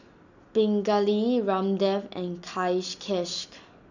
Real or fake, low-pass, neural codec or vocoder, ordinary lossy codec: real; 7.2 kHz; none; none